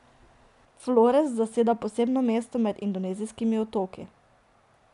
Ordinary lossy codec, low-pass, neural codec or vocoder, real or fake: none; 10.8 kHz; none; real